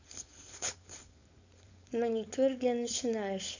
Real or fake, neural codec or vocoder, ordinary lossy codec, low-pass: fake; codec, 16 kHz, 4.8 kbps, FACodec; none; 7.2 kHz